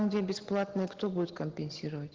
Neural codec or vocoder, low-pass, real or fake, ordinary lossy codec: none; 7.2 kHz; real; Opus, 16 kbps